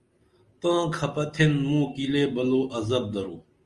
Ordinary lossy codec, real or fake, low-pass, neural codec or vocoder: Opus, 32 kbps; real; 10.8 kHz; none